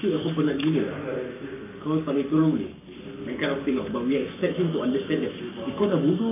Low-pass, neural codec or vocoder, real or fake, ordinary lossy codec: 3.6 kHz; codec, 44.1 kHz, 7.8 kbps, Pupu-Codec; fake; none